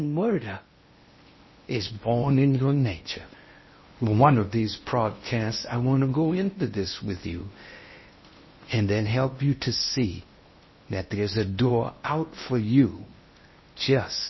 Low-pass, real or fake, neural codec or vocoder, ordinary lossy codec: 7.2 kHz; fake; codec, 16 kHz in and 24 kHz out, 0.8 kbps, FocalCodec, streaming, 65536 codes; MP3, 24 kbps